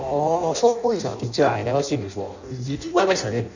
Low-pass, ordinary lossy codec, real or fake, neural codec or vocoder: 7.2 kHz; none; fake; codec, 16 kHz in and 24 kHz out, 0.6 kbps, FireRedTTS-2 codec